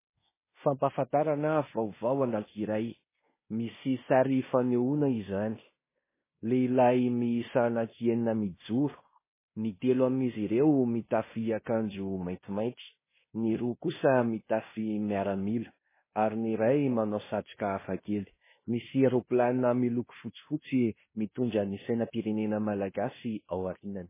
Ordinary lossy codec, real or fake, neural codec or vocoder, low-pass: MP3, 16 kbps; fake; codec, 16 kHz in and 24 kHz out, 0.9 kbps, LongCat-Audio-Codec, fine tuned four codebook decoder; 3.6 kHz